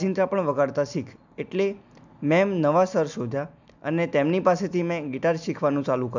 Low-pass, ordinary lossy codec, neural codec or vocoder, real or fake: 7.2 kHz; none; none; real